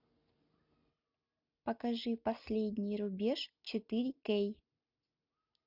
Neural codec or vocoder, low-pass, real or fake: none; 5.4 kHz; real